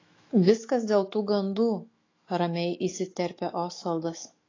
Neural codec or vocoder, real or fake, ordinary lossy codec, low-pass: autoencoder, 48 kHz, 128 numbers a frame, DAC-VAE, trained on Japanese speech; fake; AAC, 32 kbps; 7.2 kHz